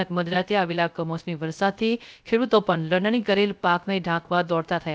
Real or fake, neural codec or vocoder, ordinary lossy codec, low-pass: fake; codec, 16 kHz, 0.3 kbps, FocalCodec; none; none